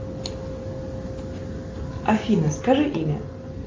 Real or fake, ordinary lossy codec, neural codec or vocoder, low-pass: real; Opus, 32 kbps; none; 7.2 kHz